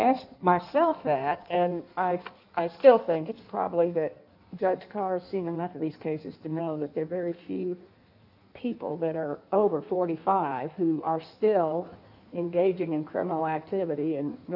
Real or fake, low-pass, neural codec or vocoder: fake; 5.4 kHz; codec, 16 kHz in and 24 kHz out, 1.1 kbps, FireRedTTS-2 codec